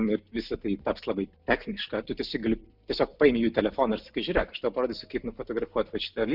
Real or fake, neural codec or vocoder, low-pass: real; none; 5.4 kHz